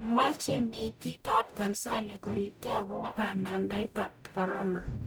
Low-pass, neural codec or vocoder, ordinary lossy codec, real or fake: none; codec, 44.1 kHz, 0.9 kbps, DAC; none; fake